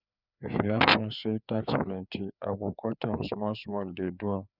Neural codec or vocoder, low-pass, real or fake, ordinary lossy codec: codec, 16 kHz, 4 kbps, FreqCodec, larger model; 5.4 kHz; fake; none